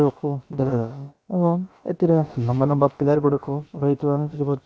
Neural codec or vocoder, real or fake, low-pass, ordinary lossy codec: codec, 16 kHz, about 1 kbps, DyCAST, with the encoder's durations; fake; none; none